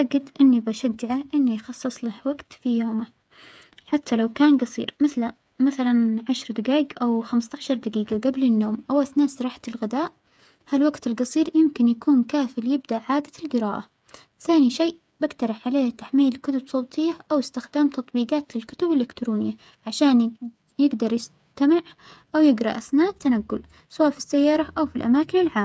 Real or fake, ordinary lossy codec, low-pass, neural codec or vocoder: fake; none; none; codec, 16 kHz, 8 kbps, FreqCodec, smaller model